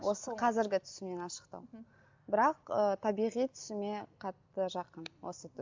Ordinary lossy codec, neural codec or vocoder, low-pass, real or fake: MP3, 64 kbps; none; 7.2 kHz; real